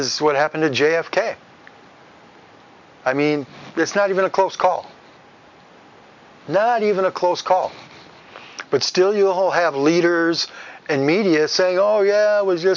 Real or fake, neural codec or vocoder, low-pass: real; none; 7.2 kHz